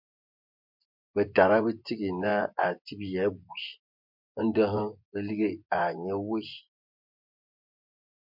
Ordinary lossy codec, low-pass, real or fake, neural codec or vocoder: MP3, 48 kbps; 5.4 kHz; fake; vocoder, 24 kHz, 100 mel bands, Vocos